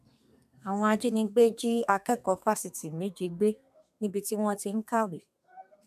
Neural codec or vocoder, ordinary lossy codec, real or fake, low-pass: codec, 32 kHz, 1.9 kbps, SNAC; none; fake; 14.4 kHz